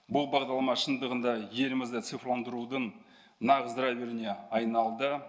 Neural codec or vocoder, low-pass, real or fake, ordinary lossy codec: none; none; real; none